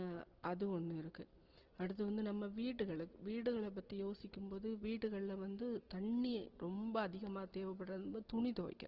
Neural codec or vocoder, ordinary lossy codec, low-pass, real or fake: none; Opus, 16 kbps; 5.4 kHz; real